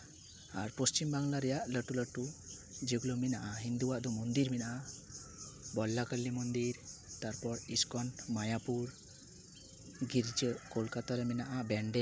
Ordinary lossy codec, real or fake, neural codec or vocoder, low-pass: none; real; none; none